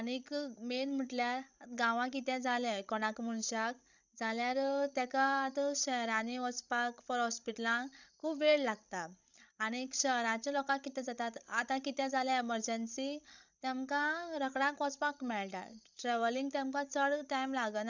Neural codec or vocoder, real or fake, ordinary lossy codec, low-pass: codec, 16 kHz, 16 kbps, FreqCodec, larger model; fake; none; 7.2 kHz